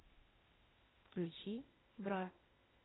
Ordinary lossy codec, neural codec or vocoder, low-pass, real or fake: AAC, 16 kbps; codec, 16 kHz, 0.8 kbps, ZipCodec; 7.2 kHz; fake